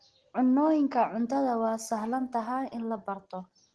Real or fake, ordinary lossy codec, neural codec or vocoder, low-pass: real; Opus, 16 kbps; none; 10.8 kHz